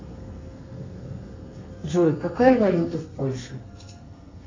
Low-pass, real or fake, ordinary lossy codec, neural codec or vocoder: 7.2 kHz; fake; AAC, 32 kbps; codec, 32 kHz, 1.9 kbps, SNAC